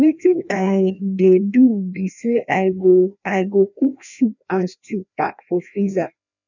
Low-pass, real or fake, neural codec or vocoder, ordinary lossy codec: 7.2 kHz; fake; codec, 16 kHz, 1 kbps, FreqCodec, larger model; none